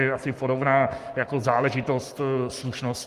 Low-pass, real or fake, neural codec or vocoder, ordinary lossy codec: 14.4 kHz; fake; codec, 44.1 kHz, 7.8 kbps, Pupu-Codec; Opus, 32 kbps